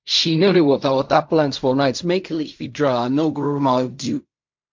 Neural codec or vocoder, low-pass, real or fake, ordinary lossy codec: codec, 16 kHz in and 24 kHz out, 0.4 kbps, LongCat-Audio-Codec, fine tuned four codebook decoder; 7.2 kHz; fake; MP3, 48 kbps